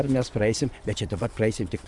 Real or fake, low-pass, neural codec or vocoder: fake; 10.8 kHz; vocoder, 24 kHz, 100 mel bands, Vocos